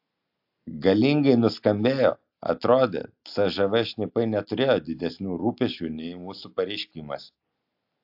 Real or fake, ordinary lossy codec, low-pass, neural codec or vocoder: real; AAC, 48 kbps; 5.4 kHz; none